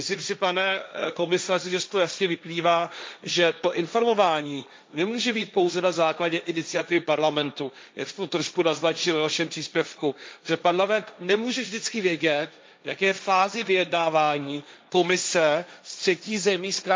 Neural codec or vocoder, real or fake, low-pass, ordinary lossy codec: codec, 16 kHz, 1.1 kbps, Voila-Tokenizer; fake; none; none